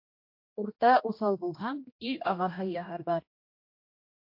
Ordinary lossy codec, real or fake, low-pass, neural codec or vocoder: MP3, 32 kbps; fake; 5.4 kHz; codec, 16 kHz, 1 kbps, X-Codec, HuBERT features, trained on general audio